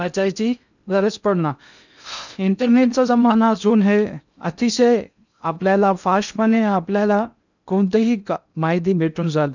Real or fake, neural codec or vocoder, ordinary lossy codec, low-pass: fake; codec, 16 kHz in and 24 kHz out, 0.6 kbps, FocalCodec, streaming, 2048 codes; none; 7.2 kHz